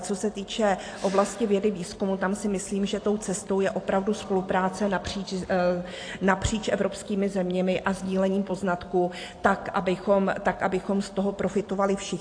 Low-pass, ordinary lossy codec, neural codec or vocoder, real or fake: 9.9 kHz; AAC, 48 kbps; none; real